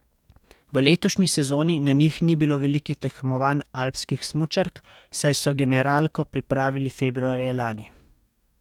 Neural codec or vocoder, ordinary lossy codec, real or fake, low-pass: codec, 44.1 kHz, 2.6 kbps, DAC; none; fake; 19.8 kHz